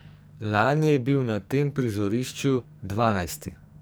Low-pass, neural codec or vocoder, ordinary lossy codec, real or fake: none; codec, 44.1 kHz, 2.6 kbps, SNAC; none; fake